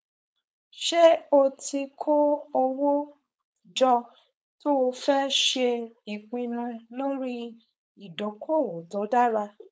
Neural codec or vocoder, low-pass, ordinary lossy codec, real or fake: codec, 16 kHz, 4.8 kbps, FACodec; none; none; fake